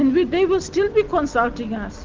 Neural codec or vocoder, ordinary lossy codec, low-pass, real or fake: none; Opus, 32 kbps; 7.2 kHz; real